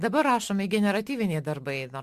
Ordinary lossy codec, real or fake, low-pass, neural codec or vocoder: Opus, 64 kbps; real; 14.4 kHz; none